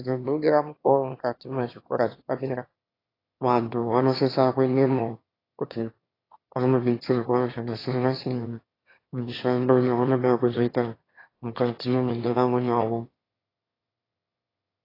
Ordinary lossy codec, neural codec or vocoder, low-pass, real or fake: AAC, 24 kbps; autoencoder, 22.05 kHz, a latent of 192 numbers a frame, VITS, trained on one speaker; 5.4 kHz; fake